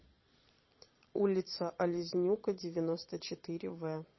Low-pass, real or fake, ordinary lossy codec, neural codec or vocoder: 7.2 kHz; fake; MP3, 24 kbps; vocoder, 22.05 kHz, 80 mel bands, Vocos